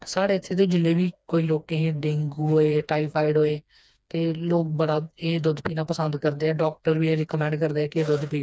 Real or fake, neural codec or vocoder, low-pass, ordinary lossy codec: fake; codec, 16 kHz, 2 kbps, FreqCodec, smaller model; none; none